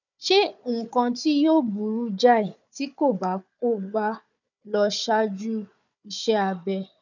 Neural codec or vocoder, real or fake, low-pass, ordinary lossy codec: codec, 16 kHz, 4 kbps, FunCodec, trained on Chinese and English, 50 frames a second; fake; 7.2 kHz; none